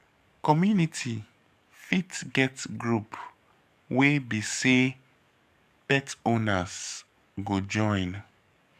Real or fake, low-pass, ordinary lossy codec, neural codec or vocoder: fake; 14.4 kHz; none; codec, 44.1 kHz, 7.8 kbps, DAC